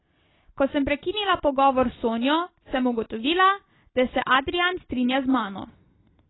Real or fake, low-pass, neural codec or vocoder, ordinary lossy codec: real; 7.2 kHz; none; AAC, 16 kbps